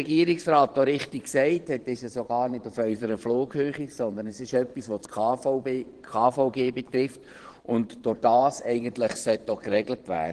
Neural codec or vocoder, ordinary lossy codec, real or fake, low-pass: vocoder, 22.05 kHz, 80 mel bands, Vocos; Opus, 16 kbps; fake; 9.9 kHz